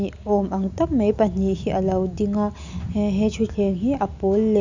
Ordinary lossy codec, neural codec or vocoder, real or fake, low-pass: none; none; real; 7.2 kHz